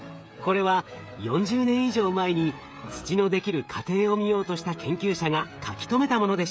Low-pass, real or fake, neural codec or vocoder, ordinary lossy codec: none; fake; codec, 16 kHz, 16 kbps, FreqCodec, smaller model; none